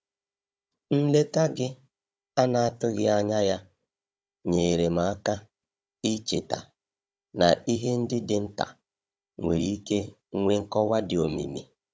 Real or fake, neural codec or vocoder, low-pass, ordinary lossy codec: fake; codec, 16 kHz, 16 kbps, FunCodec, trained on Chinese and English, 50 frames a second; none; none